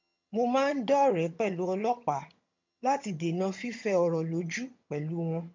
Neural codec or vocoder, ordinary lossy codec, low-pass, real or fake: vocoder, 22.05 kHz, 80 mel bands, HiFi-GAN; MP3, 48 kbps; 7.2 kHz; fake